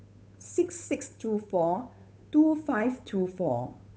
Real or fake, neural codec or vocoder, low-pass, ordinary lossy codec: fake; codec, 16 kHz, 8 kbps, FunCodec, trained on Chinese and English, 25 frames a second; none; none